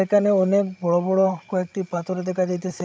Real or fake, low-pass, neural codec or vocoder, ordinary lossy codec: fake; none; codec, 16 kHz, 16 kbps, FreqCodec, larger model; none